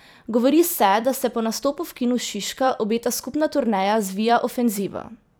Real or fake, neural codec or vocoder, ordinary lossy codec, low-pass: fake; vocoder, 44.1 kHz, 128 mel bands every 256 samples, BigVGAN v2; none; none